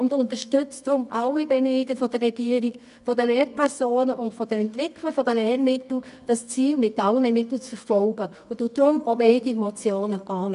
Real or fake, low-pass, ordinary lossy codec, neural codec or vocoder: fake; 10.8 kHz; none; codec, 24 kHz, 0.9 kbps, WavTokenizer, medium music audio release